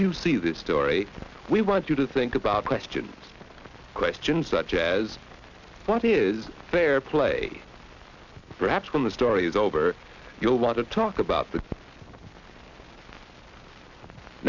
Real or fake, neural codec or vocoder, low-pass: real; none; 7.2 kHz